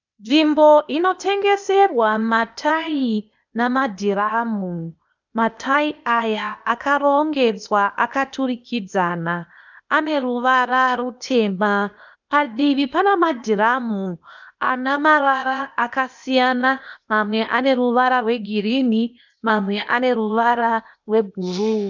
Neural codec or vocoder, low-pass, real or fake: codec, 16 kHz, 0.8 kbps, ZipCodec; 7.2 kHz; fake